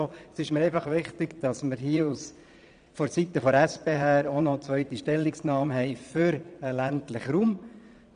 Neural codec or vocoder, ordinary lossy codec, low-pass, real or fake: vocoder, 22.05 kHz, 80 mel bands, Vocos; none; 9.9 kHz; fake